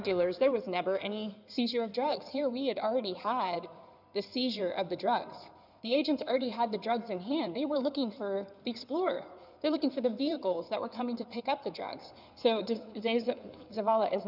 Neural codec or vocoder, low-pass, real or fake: codec, 16 kHz in and 24 kHz out, 2.2 kbps, FireRedTTS-2 codec; 5.4 kHz; fake